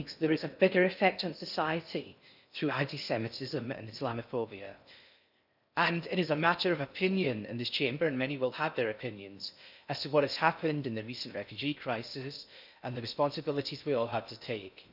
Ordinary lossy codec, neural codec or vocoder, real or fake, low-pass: none; codec, 16 kHz in and 24 kHz out, 0.6 kbps, FocalCodec, streaming, 2048 codes; fake; 5.4 kHz